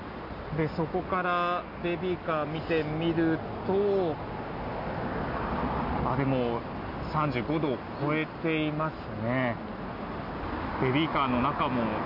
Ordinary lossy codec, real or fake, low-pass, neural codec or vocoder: none; real; 5.4 kHz; none